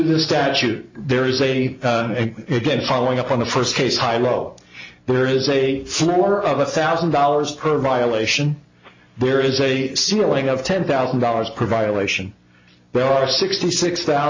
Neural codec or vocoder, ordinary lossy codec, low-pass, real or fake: none; MP3, 64 kbps; 7.2 kHz; real